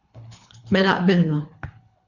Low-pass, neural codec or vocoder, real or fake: 7.2 kHz; codec, 24 kHz, 3 kbps, HILCodec; fake